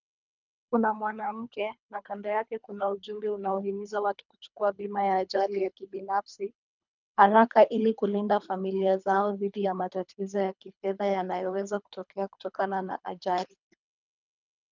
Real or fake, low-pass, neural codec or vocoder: fake; 7.2 kHz; codec, 24 kHz, 3 kbps, HILCodec